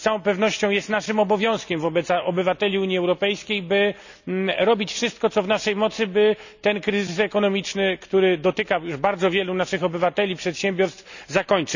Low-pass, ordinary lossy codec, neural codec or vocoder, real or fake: 7.2 kHz; none; none; real